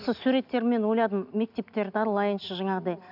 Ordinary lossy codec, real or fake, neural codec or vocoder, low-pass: none; real; none; 5.4 kHz